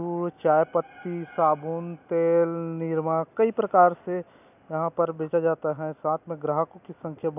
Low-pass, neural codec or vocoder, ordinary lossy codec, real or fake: 3.6 kHz; none; none; real